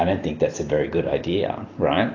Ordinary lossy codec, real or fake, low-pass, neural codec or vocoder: AAC, 32 kbps; real; 7.2 kHz; none